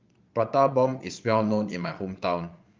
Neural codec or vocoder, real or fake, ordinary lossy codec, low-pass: vocoder, 22.05 kHz, 80 mel bands, Vocos; fake; Opus, 24 kbps; 7.2 kHz